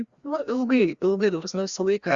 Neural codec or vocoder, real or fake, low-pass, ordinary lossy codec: codec, 16 kHz, 1 kbps, FreqCodec, larger model; fake; 7.2 kHz; Opus, 64 kbps